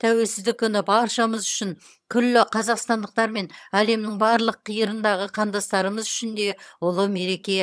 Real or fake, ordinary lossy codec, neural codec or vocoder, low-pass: fake; none; vocoder, 22.05 kHz, 80 mel bands, HiFi-GAN; none